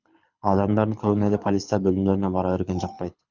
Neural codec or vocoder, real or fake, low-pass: codec, 24 kHz, 6 kbps, HILCodec; fake; 7.2 kHz